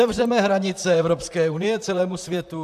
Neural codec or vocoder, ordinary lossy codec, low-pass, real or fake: vocoder, 44.1 kHz, 128 mel bands, Pupu-Vocoder; AAC, 96 kbps; 14.4 kHz; fake